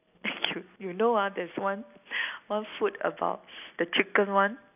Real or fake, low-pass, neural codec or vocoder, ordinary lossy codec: real; 3.6 kHz; none; none